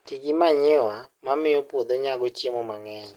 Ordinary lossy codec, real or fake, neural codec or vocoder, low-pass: Opus, 16 kbps; real; none; 19.8 kHz